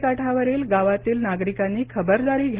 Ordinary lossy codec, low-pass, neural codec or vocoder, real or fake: Opus, 16 kbps; 3.6 kHz; none; real